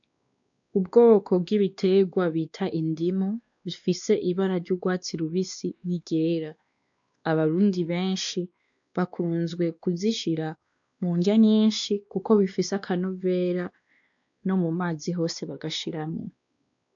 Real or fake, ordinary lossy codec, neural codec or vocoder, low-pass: fake; AAC, 64 kbps; codec, 16 kHz, 2 kbps, X-Codec, WavLM features, trained on Multilingual LibriSpeech; 7.2 kHz